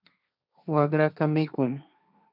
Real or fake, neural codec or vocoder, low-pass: fake; codec, 16 kHz, 1.1 kbps, Voila-Tokenizer; 5.4 kHz